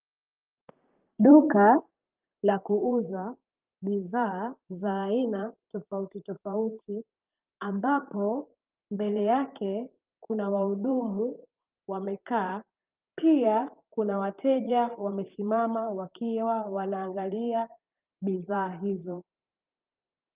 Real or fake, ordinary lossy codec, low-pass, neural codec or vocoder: fake; Opus, 24 kbps; 3.6 kHz; vocoder, 44.1 kHz, 128 mel bands, Pupu-Vocoder